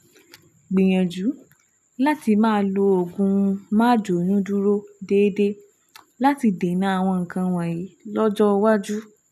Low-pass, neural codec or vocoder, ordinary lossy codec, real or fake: 14.4 kHz; none; none; real